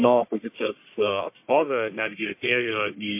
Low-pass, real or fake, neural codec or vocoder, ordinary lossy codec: 3.6 kHz; fake; codec, 44.1 kHz, 1.7 kbps, Pupu-Codec; MP3, 32 kbps